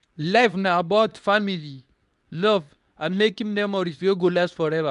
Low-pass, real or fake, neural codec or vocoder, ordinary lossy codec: 10.8 kHz; fake; codec, 24 kHz, 0.9 kbps, WavTokenizer, medium speech release version 2; none